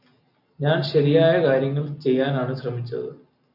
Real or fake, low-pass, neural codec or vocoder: real; 5.4 kHz; none